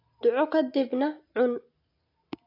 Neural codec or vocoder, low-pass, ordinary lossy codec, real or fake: none; 5.4 kHz; AAC, 32 kbps; real